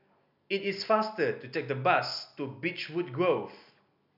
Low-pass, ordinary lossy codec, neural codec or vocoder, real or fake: 5.4 kHz; none; none; real